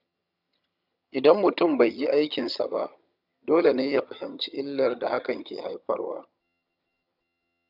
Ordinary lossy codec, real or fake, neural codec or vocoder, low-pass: none; fake; vocoder, 22.05 kHz, 80 mel bands, HiFi-GAN; 5.4 kHz